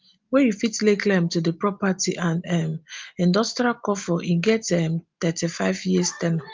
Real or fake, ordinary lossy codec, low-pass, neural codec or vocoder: real; Opus, 32 kbps; 7.2 kHz; none